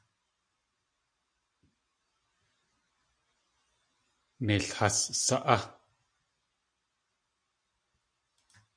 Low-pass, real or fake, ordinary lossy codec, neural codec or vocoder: 9.9 kHz; real; MP3, 48 kbps; none